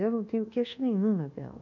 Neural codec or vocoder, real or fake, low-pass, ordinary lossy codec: codec, 24 kHz, 1.2 kbps, DualCodec; fake; 7.2 kHz; none